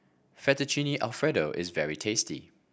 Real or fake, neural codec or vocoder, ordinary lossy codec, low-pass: real; none; none; none